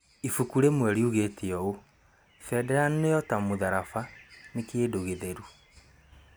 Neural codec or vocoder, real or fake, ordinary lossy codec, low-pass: none; real; none; none